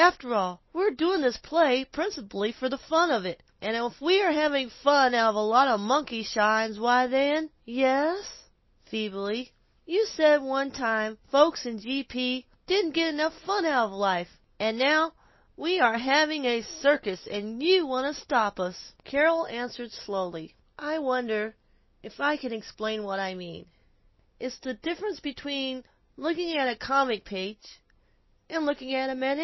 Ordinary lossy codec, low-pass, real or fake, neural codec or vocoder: MP3, 24 kbps; 7.2 kHz; real; none